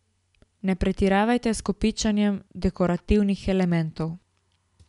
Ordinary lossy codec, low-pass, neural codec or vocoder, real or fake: MP3, 96 kbps; 10.8 kHz; none; real